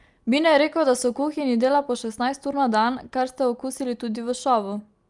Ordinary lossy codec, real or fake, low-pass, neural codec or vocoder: Opus, 32 kbps; real; 10.8 kHz; none